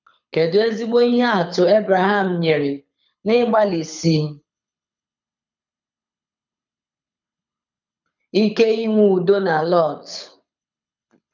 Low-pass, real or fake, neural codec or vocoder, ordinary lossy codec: 7.2 kHz; fake; codec, 24 kHz, 6 kbps, HILCodec; none